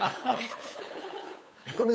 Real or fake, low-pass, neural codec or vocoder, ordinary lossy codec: fake; none; codec, 16 kHz, 8 kbps, FunCodec, trained on LibriTTS, 25 frames a second; none